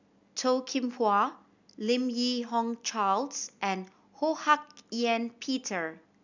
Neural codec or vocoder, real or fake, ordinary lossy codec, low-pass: none; real; none; 7.2 kHz